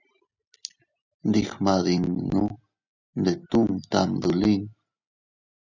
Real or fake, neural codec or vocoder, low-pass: real; none; 7.2 kHz